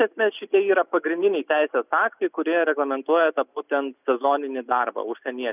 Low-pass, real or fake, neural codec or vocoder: 3.6 kHz; real; none